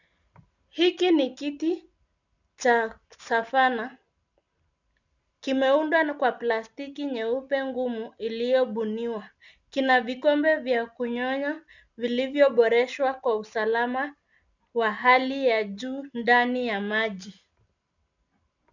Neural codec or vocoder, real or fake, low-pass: none; real; 7.2 kHz